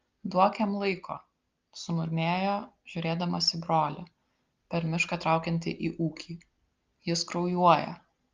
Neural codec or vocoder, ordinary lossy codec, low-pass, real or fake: none; Opus, 24 kbps; 7.2 kHz; real